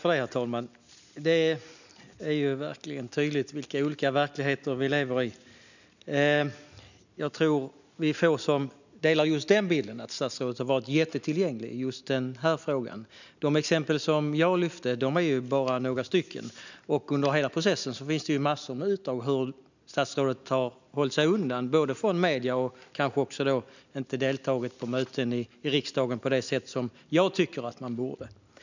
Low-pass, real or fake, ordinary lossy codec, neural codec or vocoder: 7.2 kHz; real; none; none